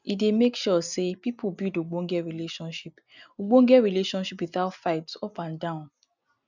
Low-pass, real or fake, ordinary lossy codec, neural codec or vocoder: 7.2 kHz; real; none; none